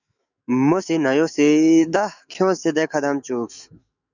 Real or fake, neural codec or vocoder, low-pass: fake; autoencoder, 48 kHz, 128 numbers a frame, DAC-VAE, trained on Japanese speech; 7.2 kHz